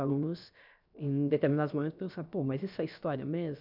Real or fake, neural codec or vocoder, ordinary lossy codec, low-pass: fake; codec, 16 kHz, about 1 kbps, DyCAST, with the encoder's durations; none; 5.4 kHz